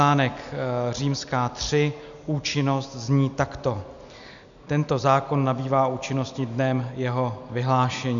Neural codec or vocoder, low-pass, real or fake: none; 7.2 kHz; real